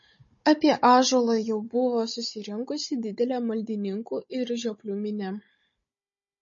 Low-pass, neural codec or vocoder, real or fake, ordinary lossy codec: 7.2 kHz; codec, 16 kHz, 16 kbps, FunCodec, trained on Chinese and English, 50 frames a second; fake; MP3, 32 kbps